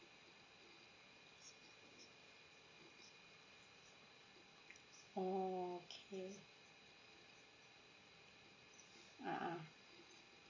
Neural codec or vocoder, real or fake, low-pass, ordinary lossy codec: none; real; 7.2 kHz; MP3, 32 kbps